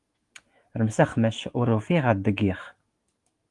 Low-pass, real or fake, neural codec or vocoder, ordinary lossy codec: 10.8 kHz; fake; autoencoder, 48 kHz, 128 numbers a frame, DAC-VAE, trained on Japanese speech; Opus, 24 kbps